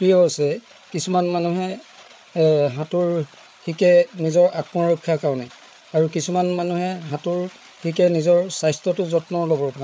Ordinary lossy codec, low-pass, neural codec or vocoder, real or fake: none; none; codec, 16 kHz, 8 kbps, FreqCodec, larger model; fake